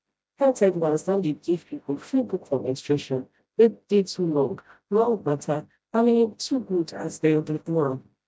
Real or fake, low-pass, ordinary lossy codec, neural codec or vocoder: fake; none; none; codec, 16 kHz, 0.5 kbps, FreqCodec, smaller model